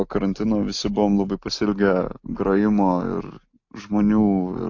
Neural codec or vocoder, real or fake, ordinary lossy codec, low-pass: none; real; AAC, 48 kbps; 7.2 kHz